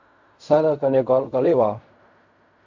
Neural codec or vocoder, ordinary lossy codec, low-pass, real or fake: codec, 16 kHz in and 24 kHz out, 0.4 kbps, LongCat-Audio-Codec, fine tuned four codebook decoder; MP3, 48 kbps; 7.2 kHz; fake